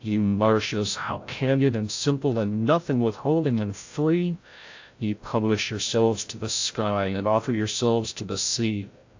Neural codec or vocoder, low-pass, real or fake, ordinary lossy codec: codec, 16 kHz, 0.5 kbps, FreqCodec, larger model; 7.2 kHz; fake; AAC, 48 kbps